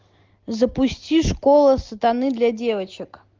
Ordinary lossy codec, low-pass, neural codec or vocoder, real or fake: Opus, 24 kbps; 7.2 kHz; none; real